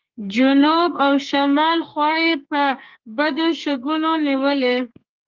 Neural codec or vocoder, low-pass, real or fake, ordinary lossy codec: codec, 32 kHz, 1.9 kbps, SNAC; 7.2 kHz; fake; Opus, 24 kbps